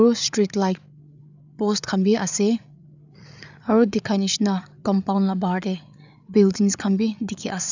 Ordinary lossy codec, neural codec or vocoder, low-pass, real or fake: none; codec, 16 kHz, 8 kbps, FreqCodec, larger model; 7.2 kHz; fake